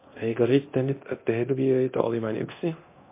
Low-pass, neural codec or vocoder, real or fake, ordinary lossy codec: 3.6 kHz; codec, 24 kHz, 0.9 kbps, DualCodec; fake; MP3, 24 kbps